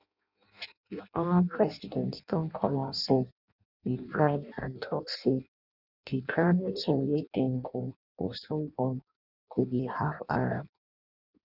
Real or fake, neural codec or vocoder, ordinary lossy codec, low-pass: fake; codec, 16 kHz in and 24 kHz out, 0.6 kbps, FireRedTTS-2 codec; none; 5.4 kHz